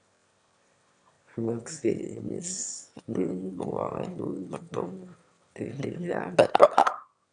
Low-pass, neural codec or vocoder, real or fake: 9.9 kHz; autoencoder, 22.05 kHz, a latent of 192 numbers a frame, VITS, trained on one speaker; fake